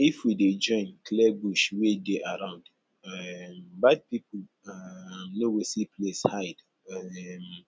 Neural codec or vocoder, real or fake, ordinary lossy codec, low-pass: none; real; none; none